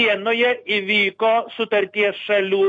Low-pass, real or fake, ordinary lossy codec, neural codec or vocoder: 9.9 kHz; real; MP3, 48 kbps; none